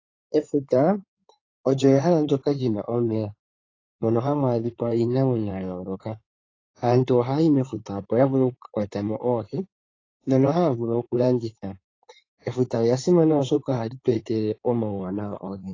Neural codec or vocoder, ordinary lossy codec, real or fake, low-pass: codec, 16 kHz in and 24 kHz out, 2.2 kbps, FireRedTTS-2 codec; AAC, 32 kbps; fake; 7.2 kHz